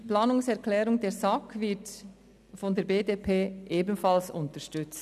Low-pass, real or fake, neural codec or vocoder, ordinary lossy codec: 14.4 kHz; real; none; none